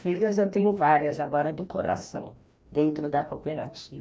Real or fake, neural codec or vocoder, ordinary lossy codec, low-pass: fake; codec, 16 kHz, 1 kbps, FreqCodec, larger model; none; none